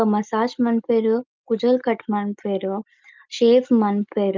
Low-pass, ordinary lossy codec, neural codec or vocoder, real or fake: 7.2 kHz; Opus, 24 kbps; none; real